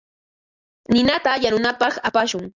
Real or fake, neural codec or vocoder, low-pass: real; none; 7.2 kHz